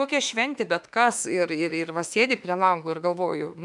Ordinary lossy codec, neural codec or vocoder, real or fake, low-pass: MP3, 96 kbps; autoencoder, 48 kHz, 32 numbers a frame, DAC-VAE, trained on Japanese speech; fake; 10.8 kHz